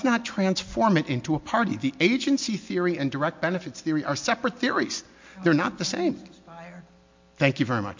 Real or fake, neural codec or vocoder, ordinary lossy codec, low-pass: real; none; MP3, 48 kbps; 7.2 kHz